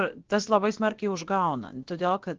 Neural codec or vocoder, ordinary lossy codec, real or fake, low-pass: codec, 16 kHz, about 1 kbps, DyCAST, with the encoder's durations; Opus, 16 kbps; fake; 7.2 kHz